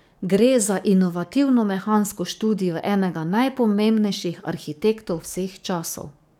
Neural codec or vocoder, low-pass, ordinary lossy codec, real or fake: codec, 44.1 kHz, 7.8 kbps, DAC; 19.8 kHz; none; fake